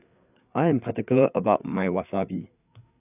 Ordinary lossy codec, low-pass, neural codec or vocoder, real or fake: none; 3.6 kHz; codec, 16 kHz, 4 kbps, FreqCodec, larger model; fake